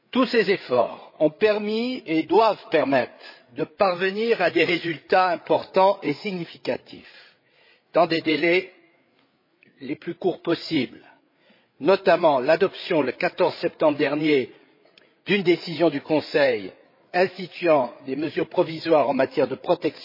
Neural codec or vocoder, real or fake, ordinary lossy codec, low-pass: codec, 16 kHz, 8 kbps, FreqCodec, larger model; fake; MP3, 24 kbps; 5.4 kHz